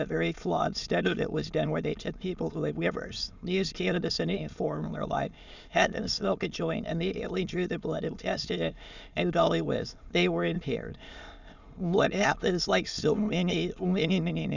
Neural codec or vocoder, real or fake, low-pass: autoencoder, 22.05 kHz, a latent of 192 numbers a frame, VITS, trained on many speakers; fake; 7.2 kHz